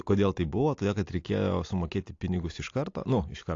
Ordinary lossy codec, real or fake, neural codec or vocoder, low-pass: AAC, 48 kbps; real; none; 7.2 kHz